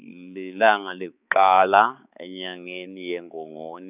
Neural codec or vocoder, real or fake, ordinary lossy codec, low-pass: codec, 16 kHz, 4 kbps, X-Codec, HuBERT features, trained on balanced general audio; fake; none; 3.6 kHz